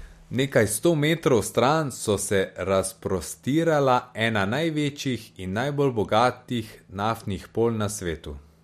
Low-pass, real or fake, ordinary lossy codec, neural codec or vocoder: 14.4 kHz; real; MP3, 64 kbps; none